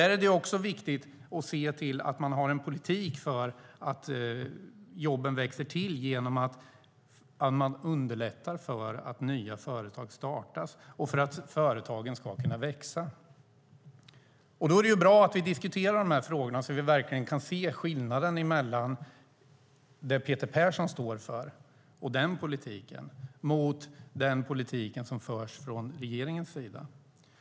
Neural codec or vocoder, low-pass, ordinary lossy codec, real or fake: none; none; none; real